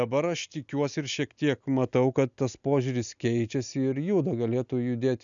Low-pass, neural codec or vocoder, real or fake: 7.2 kHz; none; real